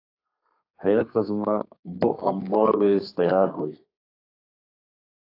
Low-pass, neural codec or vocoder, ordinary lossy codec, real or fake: 5.4 kHz; codec, 32 kHz, 1.9 kbps, SNAC; AAC, 32 kbps; fake